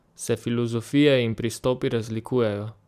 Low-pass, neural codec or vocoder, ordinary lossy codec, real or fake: 14.4 kHz; vocoder, 44.1 kHz, 128 mel bands every 512 samples, BigVGAN v2; none; fake